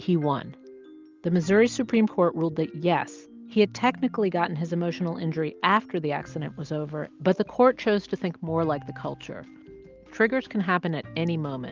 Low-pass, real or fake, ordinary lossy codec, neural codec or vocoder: 7.2 kHz; real; Opus, 32 kbps; none